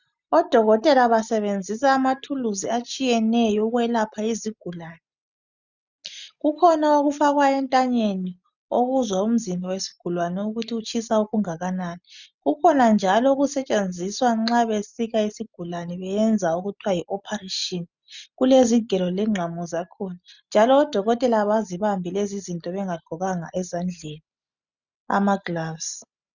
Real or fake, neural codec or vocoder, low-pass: real; none; 7.2 kHz